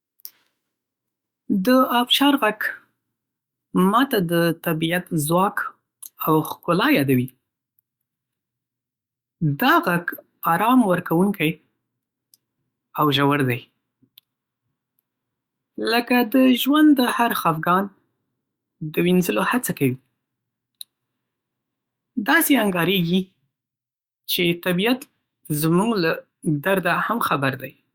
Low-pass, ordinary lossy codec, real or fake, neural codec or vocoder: 19.8 kHz; Opus, 64 kbps; fake; autoencoder, 48 kHz, 128 numbers a frame, DAC-VAE, trained on Japanese speech